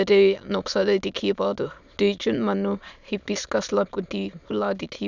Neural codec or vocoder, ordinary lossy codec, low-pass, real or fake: autoencoder, 22.05 kHz, a latent of 192 numbers a frame, VITS, trained on many speakers; none; 7.2 kHz; fake